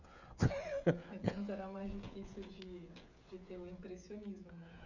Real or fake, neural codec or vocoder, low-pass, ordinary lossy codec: fake; codec, 16 kHz, 16 kbps, FreqCodec, smaller model; 7.2 kHz; none